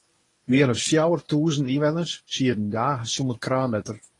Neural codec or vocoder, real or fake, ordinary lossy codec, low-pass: codec, 24 kHz, 0.9 kbps, WavTokenizer, medium speech release version 1; fake; AAC, 32 kbps; 10.8 kHz